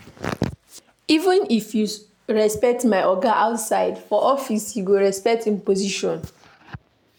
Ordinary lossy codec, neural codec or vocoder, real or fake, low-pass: none; none; real; none